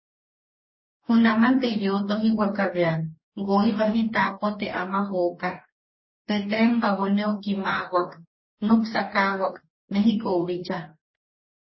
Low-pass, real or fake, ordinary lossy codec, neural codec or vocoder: 7.2 kHz; fake; MP3, 24 kbps; codec, 44.1 kHz, 2.6 kbps, DAC